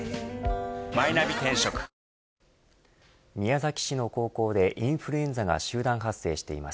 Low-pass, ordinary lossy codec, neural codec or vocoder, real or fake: none; none; none; real